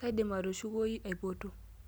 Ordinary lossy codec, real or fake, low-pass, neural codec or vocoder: none; real; none; none